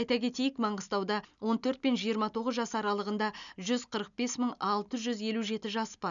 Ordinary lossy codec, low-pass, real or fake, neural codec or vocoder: none; 7.2 kHz; real; none